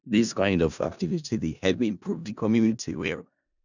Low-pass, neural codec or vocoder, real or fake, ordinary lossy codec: 7.2 kHz; codec, 16 kHz in and 24 kHz out, 0.4 kbps, LongCat-Audio-Codec, four codebook decoder; fake; none